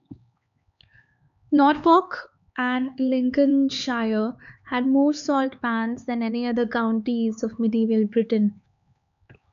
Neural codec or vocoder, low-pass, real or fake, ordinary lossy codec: codec, 16 kHz, 4 kbps, X-Codec, HuBERT features, trained on LibriSpeech; 7.2 kHz; fake; MP3, 64 kbps